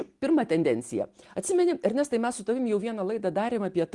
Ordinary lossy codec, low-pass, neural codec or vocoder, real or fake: Opus, 24 kbps; 10.8 kHz; none; real